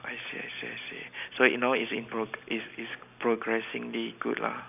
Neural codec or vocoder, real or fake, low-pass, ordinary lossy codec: none; real; 3.6 kHz; none